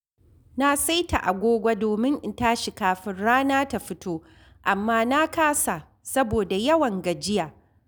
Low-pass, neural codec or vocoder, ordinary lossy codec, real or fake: none; none; none; real